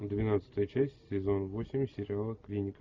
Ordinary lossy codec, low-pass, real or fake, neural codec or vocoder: MP3, 64 kbps; 7.2 kHz; real; none